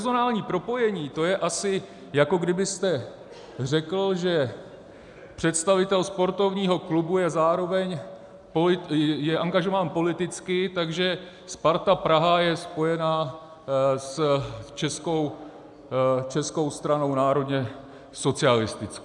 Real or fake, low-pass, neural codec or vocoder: real; 10.8 kHz; none